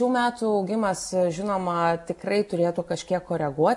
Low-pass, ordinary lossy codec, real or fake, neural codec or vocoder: 10.8 kHz; MP3, 64 kbps; real; none